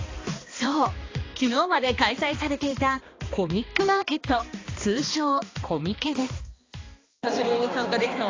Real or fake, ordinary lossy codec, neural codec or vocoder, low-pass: fake; AAC, 32 kbps; codec, 16 kHz, 2 kbps, X-Codec, HuBERT features, trained on balanced general audio; 7.2 kHz